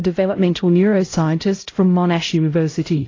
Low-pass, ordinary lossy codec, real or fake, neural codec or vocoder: 7.2 kHz; AAC, 32 kbps; fake; codec, 16 kHz, 0.5 kbps, X-Codec, HuBERT features, trained on LibriSpeech